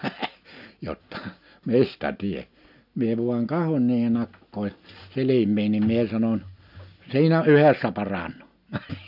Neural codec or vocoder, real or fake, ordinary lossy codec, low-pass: none; real; none; 5.4 kHz